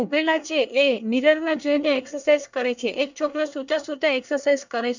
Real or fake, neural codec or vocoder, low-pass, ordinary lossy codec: fake; codec, 24 kHz, 1 kbps, SNAC; 7.2 kHz; none